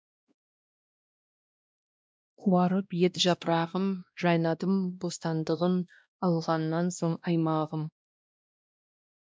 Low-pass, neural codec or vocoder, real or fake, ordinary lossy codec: none; codec, 16 kHz, 1 kbps, X-Codec, WavLM features, trained on Multilingual LibriSpeech; fake; none